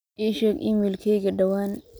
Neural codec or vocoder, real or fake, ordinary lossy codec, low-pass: none; real; none; none